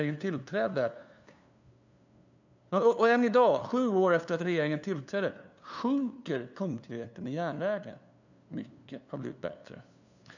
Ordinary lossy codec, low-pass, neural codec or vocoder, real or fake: none; 7.2 kHz; codec, 16 kHz, 2 kbps, FunCodec, trained on LibriTTS, 25 frames a second; fake